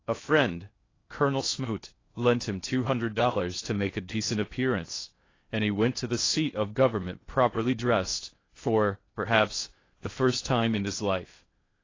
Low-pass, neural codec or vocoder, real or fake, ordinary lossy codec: 7.2 kHz; codec, 16 kHz in and 24 kHz out, 0.6 kbps, FocalCodec, streaming, 4096 codes; fake; AAC, 32 kbps